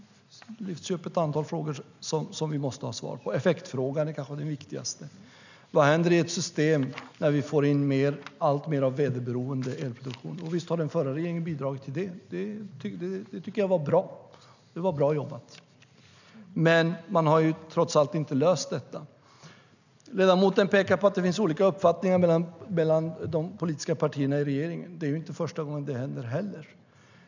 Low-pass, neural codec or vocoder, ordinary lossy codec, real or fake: 7.2 kHz; none; none; real